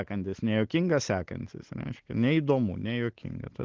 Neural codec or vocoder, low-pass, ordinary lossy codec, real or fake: none; 7.2 kHz; Opus, 16 kbps; real